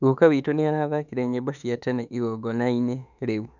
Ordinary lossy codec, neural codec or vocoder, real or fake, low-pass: none; autoencoder, 48 kHz, 32 numbers a frame, DAC-VAE, trained on Japanese speech; fake; 7.2 kHz